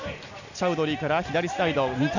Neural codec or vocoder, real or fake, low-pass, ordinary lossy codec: none; real; 7.2 kHz; none